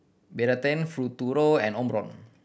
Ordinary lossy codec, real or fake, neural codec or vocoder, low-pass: none; real; none; none